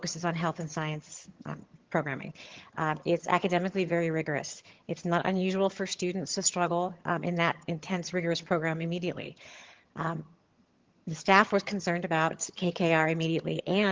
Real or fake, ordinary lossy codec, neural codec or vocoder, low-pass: fake; Opus, 16 kbps; vocoder, 22.05 kHz, 80 mel bands, HiFi-GAN; 7.2 kHz